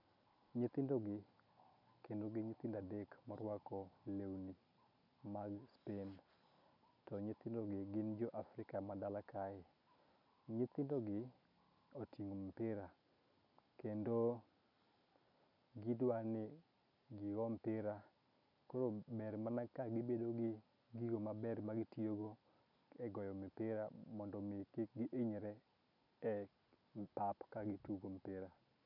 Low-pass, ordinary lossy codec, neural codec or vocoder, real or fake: 5.4 kHz; none; none; real